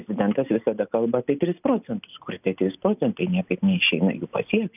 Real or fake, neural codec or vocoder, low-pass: real; none; 3.6 kHz